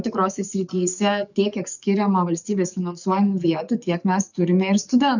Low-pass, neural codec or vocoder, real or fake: 7.2 kHz; codec, 44.1 kHz, 7.8 kbps, DAC; fake